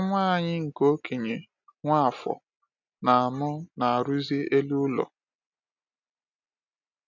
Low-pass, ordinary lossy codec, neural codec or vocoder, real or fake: 7.2 kHz; none; none; real